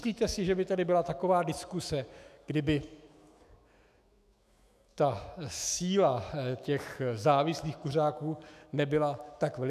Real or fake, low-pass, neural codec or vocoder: fake; 14.4 kHz; autoencoder, 48 kHz, 128 numbers a frame, DAC-VAE, trained on Japanese speech